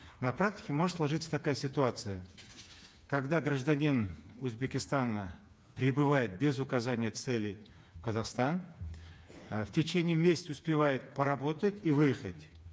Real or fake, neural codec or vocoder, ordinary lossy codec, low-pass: fake; codec, 16 kHz, 4 kbps, FreqCodec, smaller model; none; none